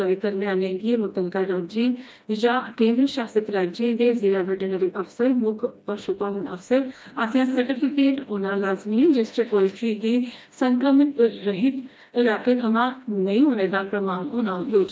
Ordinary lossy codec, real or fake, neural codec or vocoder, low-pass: none; fake; codec, 16 kHz, 1 kbps, FreqCodec, smaller model; none